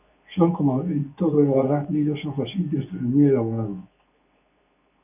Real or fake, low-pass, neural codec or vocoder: fake; 3.6 kHz; codec, 24 kHz, 0.9 kbps, WavTokenizer, medium speech release version 2